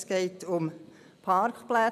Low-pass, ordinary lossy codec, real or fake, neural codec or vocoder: 14.4 kHz; none; real; none